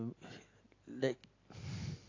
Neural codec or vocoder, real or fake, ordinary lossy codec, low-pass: none; real; AAC, 32 kbps; 7.2 kHz